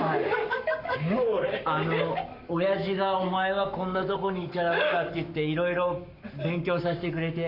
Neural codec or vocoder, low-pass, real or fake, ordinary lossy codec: codec, 44.1 kHz, 7.8 kbps, Pupu-Codec; 5.4 kHz; fake; none